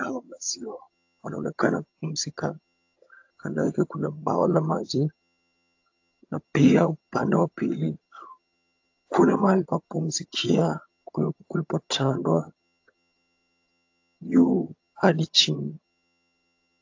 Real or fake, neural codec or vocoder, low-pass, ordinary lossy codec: fake; vocoder, 22.05 kHz, 80 mel bands, HiFi-GAN; 7.2 kHz; AAC, 48 kbps